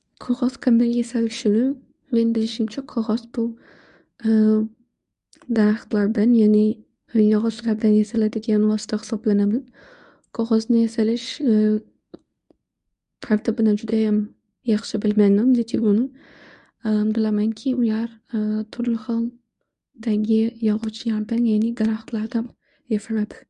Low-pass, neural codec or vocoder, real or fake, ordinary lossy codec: 10.8 kHz; codec, 24 kHz, 0.9 kbps, WavTokenizer, medium speech release version 1; fake; MP3, 96 kbps